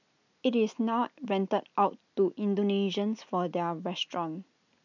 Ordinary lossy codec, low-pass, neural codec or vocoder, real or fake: none; 7.2 kHz; none; real